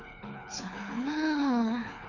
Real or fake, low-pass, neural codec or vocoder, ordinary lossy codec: fake; 7.2 kHz; codec, 24 kHz, 6 kbps, HILCodec; none